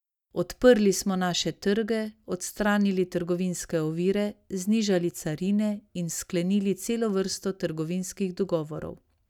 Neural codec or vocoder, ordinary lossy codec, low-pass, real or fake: none; none; 19.8 kHz; real